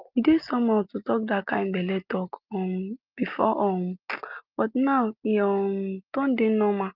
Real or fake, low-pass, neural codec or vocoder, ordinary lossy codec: real; 5.4 kHz; none; Opus, 24 kbps